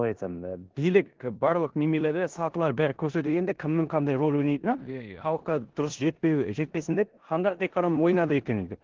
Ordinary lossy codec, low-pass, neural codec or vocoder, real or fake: Opus, 16 kbps; 7.2 kHz; codec, 16 kHz in and 24 kHz out, 0.9 kbps, LongCat-Audio-Codec, four codebook decoder; fake